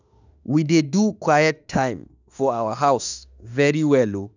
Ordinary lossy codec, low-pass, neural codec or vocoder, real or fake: none; 7.2 kHz; autoencoder, 48 kHz, 32 numbers a frame, DAC-VAE, trained on Japanese speech; fake